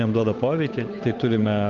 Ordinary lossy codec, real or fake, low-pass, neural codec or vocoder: Opus, 24 kbps; fake; 7.2 kHz; codec, 16 kHz, 8 kbps, FunCodec, trained on Chinese and English, 25 frames a second